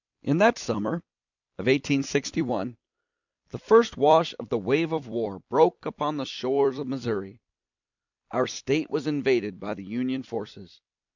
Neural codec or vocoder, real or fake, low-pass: vocoder, 44.1 kHz, 128 mel bands every 256 samples, BigVGAN v2; fake; 7.2 kHz